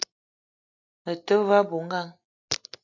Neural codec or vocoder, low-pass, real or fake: none; 7.2 kHz; real